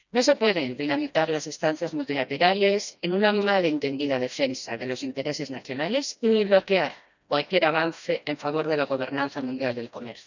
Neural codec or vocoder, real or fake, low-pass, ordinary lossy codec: codec, 16 kHz, 1 kbps, FreqCodec, smaller model; fake; 7.2 kHz; none